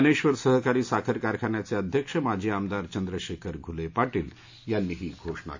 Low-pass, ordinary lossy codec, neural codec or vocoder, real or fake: 7.2 kHz; AAC, 48 kbps; none; real